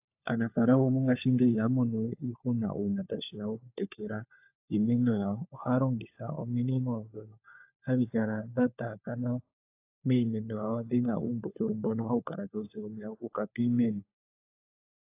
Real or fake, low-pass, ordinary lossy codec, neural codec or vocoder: fake; 3.6 kHz; AAC, 32 kbps; codec, 16 kHz, 4 kbps, FunCodec, trained on LibriTTS, 50 frames a second